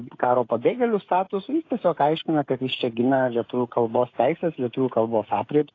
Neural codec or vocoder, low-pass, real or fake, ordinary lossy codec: codec, 16 kHz, 16 kbps, FreqCodec, smaller model; 7.2 kHz; fake; AAC, 32 kbps